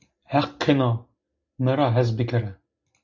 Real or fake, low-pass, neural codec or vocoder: real; 7.2 kHz; none